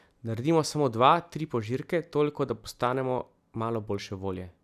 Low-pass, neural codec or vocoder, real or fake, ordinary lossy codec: 14.4 kHz; none; real; none